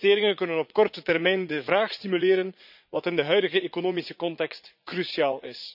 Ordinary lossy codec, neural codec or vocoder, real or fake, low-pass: none; vocoder, 44.1 kHz, 80 mel bands, Vocos; fake; 5.4 kHz